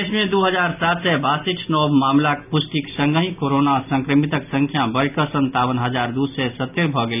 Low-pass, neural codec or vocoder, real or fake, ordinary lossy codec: 3.6 kHz; none; real; none